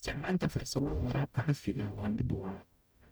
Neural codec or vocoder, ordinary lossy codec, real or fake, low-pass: codec, 44.1 kHz, 0.9 kbps, DAC; none; fake; none